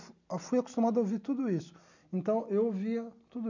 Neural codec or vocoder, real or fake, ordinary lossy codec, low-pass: none; real; none; 7.2 kHz